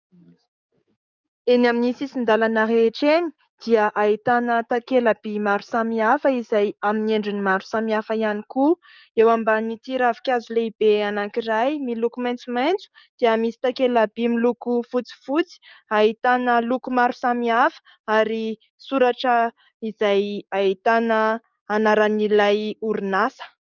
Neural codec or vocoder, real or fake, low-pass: codec, 44.1 kHz, 7.8 kbps, DAC; fake; 7.2 kHz